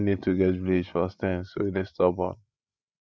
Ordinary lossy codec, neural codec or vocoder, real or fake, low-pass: none; codec, 16 kHz, 16 kbps, FreqCodec, larger model; fake; none